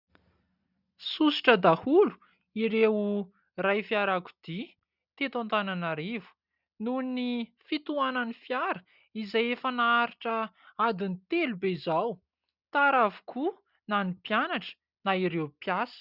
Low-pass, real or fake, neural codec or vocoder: 5.4 kHz; real; none